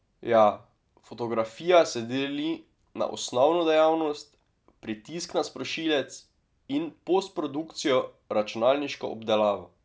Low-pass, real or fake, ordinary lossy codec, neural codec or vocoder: none; real; none; none